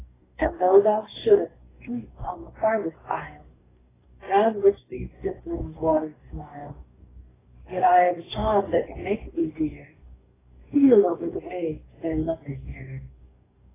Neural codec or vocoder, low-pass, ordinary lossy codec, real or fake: codec, 44.1 kHz, 2.6 kbps, DAC; 3.6 kHz; AAC, 16 kbps; fake